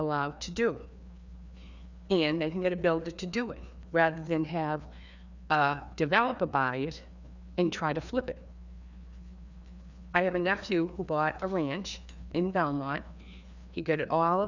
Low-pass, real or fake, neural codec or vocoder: 7.2 kHz; fake; codec, 16 kHz, 2 kbps, FreqCodec, larger model